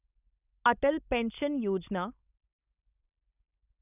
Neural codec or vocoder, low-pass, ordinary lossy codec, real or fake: codec, 16 kHz, 4.8 kbps, FACodec; 3.6 kHz; none; fake